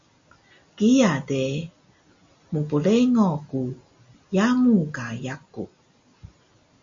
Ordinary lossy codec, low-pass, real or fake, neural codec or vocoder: MP3, 48 kbps; 7.2 kHz; real; none